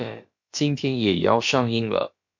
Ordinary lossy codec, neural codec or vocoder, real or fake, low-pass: MP3, 48 kbps; codec, 16 kHz, about 1 kbps, DyCAST, with the encoder's durations; fake; 7.2 kHz